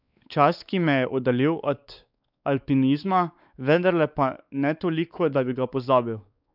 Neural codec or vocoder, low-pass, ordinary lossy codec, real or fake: codec, 16 kHz, 4 kbps, X-Codec, WavLM features, trained on Multilingual LibriSpeech; 5.4 kHz; none; fake